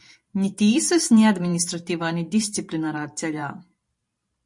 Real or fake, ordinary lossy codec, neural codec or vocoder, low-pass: fake; MP3, 48 kbps; vocoder, 44.1 kHz, 128 mel bands every 512 samples, BigVGAN v2; 10.8 kHz